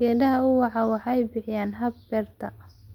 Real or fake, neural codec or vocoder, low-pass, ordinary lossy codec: real; none; 19.8 kHz; Opus, 32 kbps